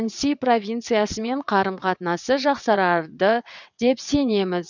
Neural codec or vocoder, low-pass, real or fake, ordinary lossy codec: vocoder, 22.05 kHz, 80 mel bands, WaveNeXt; 7.2 kHz; fake; none